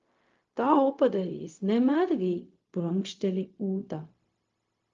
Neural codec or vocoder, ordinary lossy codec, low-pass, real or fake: codec, 16 kHz, 0.4 kbps, LongCat-Audio-Codec; Opus, 32 kbps; 7.2 kHz; fake